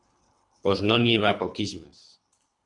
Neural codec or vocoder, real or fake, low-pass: codec, 24 kHz, 3 kbps, HILCodec; fake; 10.8 kHz